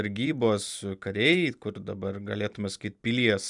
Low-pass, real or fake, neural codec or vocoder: 10.8 kHz; fake; vocoder, 44.1 kHz, 128 mel bands every 256 samples, BigVGAN v2